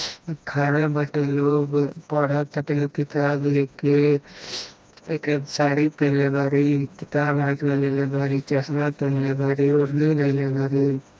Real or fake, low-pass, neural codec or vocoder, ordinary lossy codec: fake; none; codec, 16 kHz, 1 kbps, FreqCodec, smaller model; none